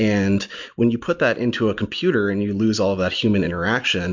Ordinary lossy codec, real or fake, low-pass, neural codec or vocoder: MP3, 64 kbps; real; 7.2 kHz; none